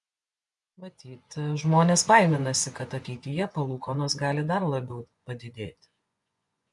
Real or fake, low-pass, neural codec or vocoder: fake; 10.8 kHz; vocoder, 24 kHz, 100 mel bands, Vocos